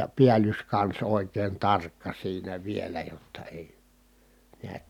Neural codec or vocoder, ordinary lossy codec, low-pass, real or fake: none; none; 19.8 kHz; real